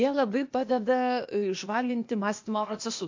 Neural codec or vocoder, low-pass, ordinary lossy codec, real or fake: codec, 16 kHz, 0.8 kbps, ZipCodec; 7.2 kHz; MP3, 48 kbps; fake